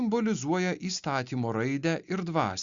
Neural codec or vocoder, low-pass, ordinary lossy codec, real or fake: none; 7.2 kHz; Opus, 64 kbps; real